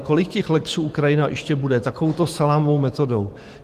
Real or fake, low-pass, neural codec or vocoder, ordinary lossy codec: fake; 14.4 kHz; autoencoder, 48 kHz, 128 numbers a frame, DAC-VAE, trained on Japanese speech; Opus, 24 kbps